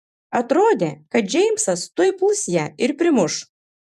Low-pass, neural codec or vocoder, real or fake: 14.4 kHz; vocoder, 48 kHz, 128 mel bands, Vocos; fake